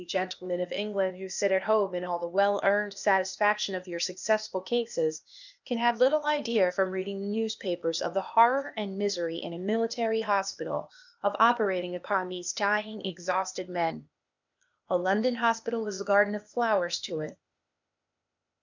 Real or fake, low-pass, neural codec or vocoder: fake; 7.2 kHz; codec, 16 kHz, 0.8 kbps, ZipCodec